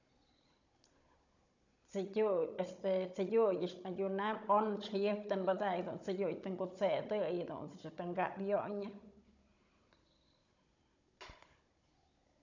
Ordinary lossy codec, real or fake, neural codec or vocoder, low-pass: none; fake; codec, 16 kHz, 16 kbps, FunCodec, trained on Chinese and English, 50 frames a second; 7.2 kHz